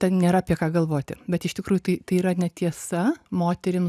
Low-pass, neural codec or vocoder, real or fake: 14.4 kHz; none; real